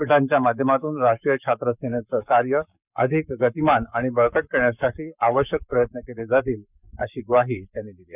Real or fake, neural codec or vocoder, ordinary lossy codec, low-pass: fake; codec, 44.1 kHz, 7.8 kbps, Pupu-Codec; none; 3.6 kHz